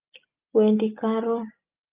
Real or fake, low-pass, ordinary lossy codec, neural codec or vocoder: real; 3.6 kHz; Opus, 24 kbps; none